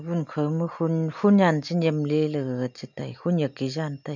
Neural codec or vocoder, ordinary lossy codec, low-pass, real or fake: none; none; 7.2 kHz; real